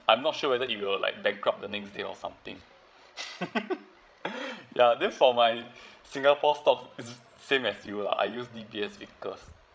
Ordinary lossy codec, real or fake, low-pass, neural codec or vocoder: none; fake; none; codec, 16 kHz, 16 kbps, FreqCodec, larger model